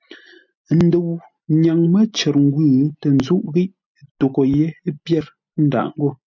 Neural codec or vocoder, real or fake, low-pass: none; real; 7.2 kHz